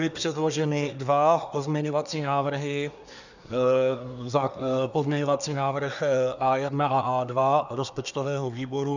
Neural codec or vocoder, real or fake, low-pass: codec, 24 kHz, 1 kbps, SNAC; fake; 7.2 kHz